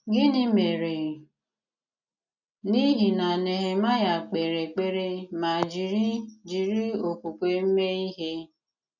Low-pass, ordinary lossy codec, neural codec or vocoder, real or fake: 7.2 kHz; none; none; real